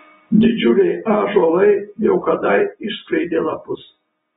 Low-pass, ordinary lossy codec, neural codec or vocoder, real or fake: 19.8 kHz; AAC, 16 kbps; none; real